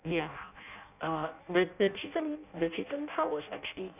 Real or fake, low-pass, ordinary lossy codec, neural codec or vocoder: fake; 3.6 kHz; none; codec, 16 kHz in and 24 kHz out, 0.6 kbps, FireRedTTS-2 codec